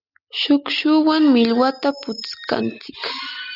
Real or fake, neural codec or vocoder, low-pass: real; none; 5.4 kHz